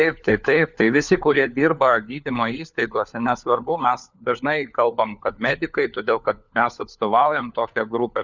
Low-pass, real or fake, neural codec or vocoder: 7.2 kHz; fake; codec, 16 kHz, 4 kbps, FunCodec, trained on LibriTTS, 50 frames a second